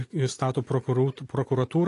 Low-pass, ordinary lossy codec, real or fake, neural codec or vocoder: 10.8 kHz; AAC, 48 kbps; real; none